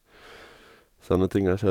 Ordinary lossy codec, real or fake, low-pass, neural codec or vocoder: none; fake; 19.8 kHz; codec, 44.1 kHz, 7.8 kbps, DAC